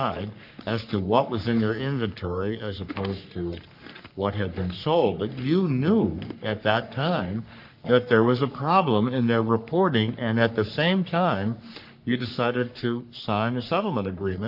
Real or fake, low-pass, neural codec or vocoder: fake; 5.4 kHz; codec, 44.1 kHz, 3.4 kbps, Pupu-Codec